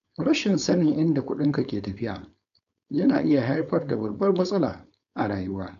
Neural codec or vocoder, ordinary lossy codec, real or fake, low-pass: codec, 16 kHz, 4.8 kbps, FACodec; none; fake; 7.2 kHz